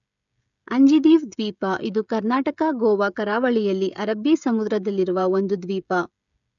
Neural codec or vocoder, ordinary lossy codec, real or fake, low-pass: codec, 16 kHz, 16 kbps, FreqCodec, smaller model; none; fake; 7.2 kHz